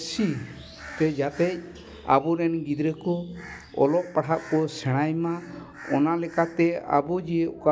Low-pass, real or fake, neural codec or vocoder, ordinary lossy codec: none; real; none; none